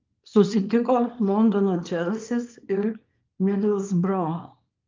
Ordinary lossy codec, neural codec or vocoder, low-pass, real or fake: Opus, 32 kbps; codec, 24 kHz, 0.9 kbps, WavTokenizer, small release; 7.2 kHz; fake